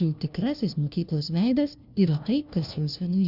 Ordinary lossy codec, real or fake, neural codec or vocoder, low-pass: Opus, 64 kbps; fake; codec, 16 kHz, 1 kbps, FunCodec, trained on LibriTTS, 50 frames a second; 5.4 kHz